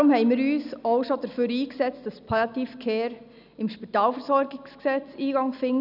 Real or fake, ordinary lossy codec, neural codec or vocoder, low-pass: real; none; none; 5.4 kHz